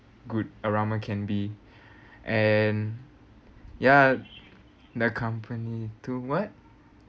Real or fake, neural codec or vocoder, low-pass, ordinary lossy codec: real; none; none; none